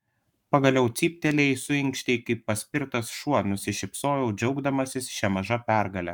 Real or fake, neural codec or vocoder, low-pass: fake; codec, 44.1 kHz, 7.8 kbps, Pupu-Codec; 19.8 kHz